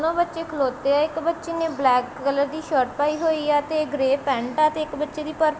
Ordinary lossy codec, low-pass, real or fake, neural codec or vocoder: none; none; real; none